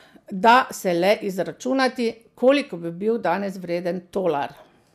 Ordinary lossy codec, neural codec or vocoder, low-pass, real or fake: MP3, 96 kbps; none; 14.4 kHz; real